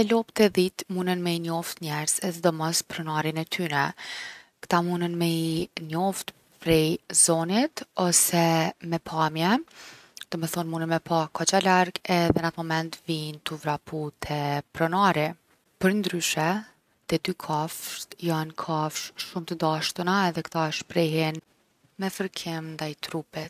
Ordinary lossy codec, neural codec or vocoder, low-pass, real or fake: none; none; 14.4 kHz; real